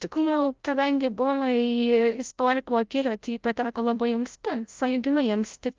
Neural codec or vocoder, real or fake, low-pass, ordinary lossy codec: codec, 16 kHz, 0.5 kbps, FreqCodec, larger model; fake; 7.2 kHz; Opus, 32 kbps